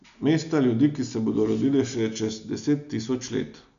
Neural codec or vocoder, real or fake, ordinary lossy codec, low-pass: none; real; none; 7.2 kHz